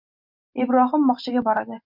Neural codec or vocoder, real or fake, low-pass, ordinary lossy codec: none; real; 5.4 kHz; MP3, 48 kbps